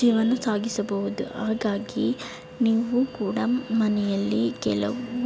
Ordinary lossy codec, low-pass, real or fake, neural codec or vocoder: none; none; real; none